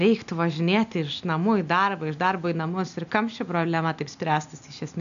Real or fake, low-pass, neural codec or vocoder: real; 7.2 kHz; none